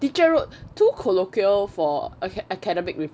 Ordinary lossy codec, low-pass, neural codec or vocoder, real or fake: none; none; none; real